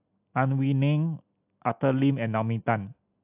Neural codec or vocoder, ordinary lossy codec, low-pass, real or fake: none; AAC, 32 kbps; 3.6 kHz; real